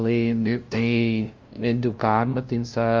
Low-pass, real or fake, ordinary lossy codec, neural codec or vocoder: 7.2 kHz; fake; Opus, 32 kbps; codec, 16 kHz, 0.5 kbps, FunCodec, trained on LibriTTS, 25 frames a second